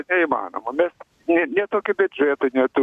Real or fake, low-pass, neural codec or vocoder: real; 14.4 kHz; none